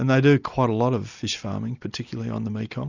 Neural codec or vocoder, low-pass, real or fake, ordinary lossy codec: none; 7.2 kHz; real; Opus, 64 kbps